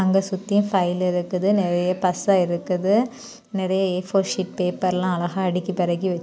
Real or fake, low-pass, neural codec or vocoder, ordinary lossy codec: real; none; none; none